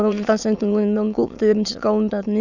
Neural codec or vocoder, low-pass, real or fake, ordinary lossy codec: autoencoder, 22.05 kHz, a latent of 192 numbers a frame, VITS, trained on many speakers; 7.2 kHz; fake; none